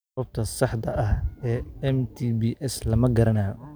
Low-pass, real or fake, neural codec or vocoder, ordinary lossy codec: none; real; none; none